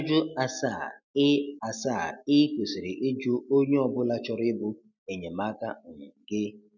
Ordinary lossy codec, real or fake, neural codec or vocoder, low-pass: none; real; none; 7.2 kHz